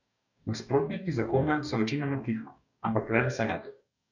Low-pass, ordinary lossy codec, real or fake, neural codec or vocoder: 7.2 kHz; none; fake; codec, 44.1 kHz, 2.6 kbps, DAC